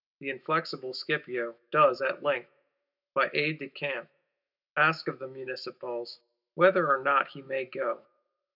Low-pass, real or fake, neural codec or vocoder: 5.4 kHz; fake; autoencoder, 48 kHz, 128 numbers a frame, DAC-VAE, trained on Japanese speech